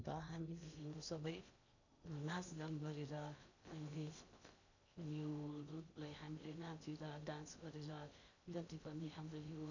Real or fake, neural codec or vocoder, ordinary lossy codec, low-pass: fake; codec, 16 kHz in and 24 kHz out, 0.8 kbps, FocalCodec, streaming, 65536 codes; none; 7.2 kHz